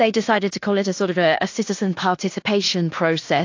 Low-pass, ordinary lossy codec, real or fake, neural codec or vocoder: 7.2 kHz; AAC, 48 kbps; fake; codec, 16 kHz in and 24 kHz out, 0.9 kbps, LongCat-Audio-Codec, fine tuned four codebook decoder